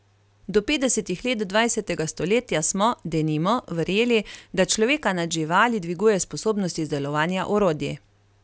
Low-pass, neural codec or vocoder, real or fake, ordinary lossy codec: none; none; real; none